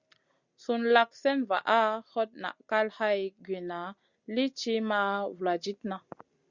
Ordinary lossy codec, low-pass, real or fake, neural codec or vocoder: Opus, 64 kbps; 7.2 kHz; real; none